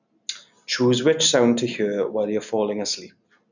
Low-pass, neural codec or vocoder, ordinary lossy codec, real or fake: 7.2 kHz; none; none; real